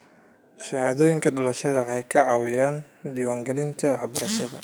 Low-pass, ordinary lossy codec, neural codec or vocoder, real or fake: none; none; codec, 44.1 kHz, 2.6 kbps, SNAC; fake